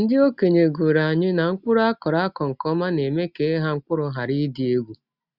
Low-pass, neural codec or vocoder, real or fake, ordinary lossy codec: 5.4 kHz; none; real; Opus, 64 kbps